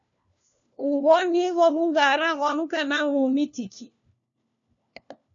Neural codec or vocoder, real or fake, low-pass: codec, 16 kHz, 1 kbps, FunCodec, trained on LibriTTS, 50 frames a second; fake; 7.2 kHz